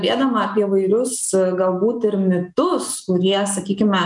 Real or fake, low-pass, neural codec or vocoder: real; 10.8 kHz; none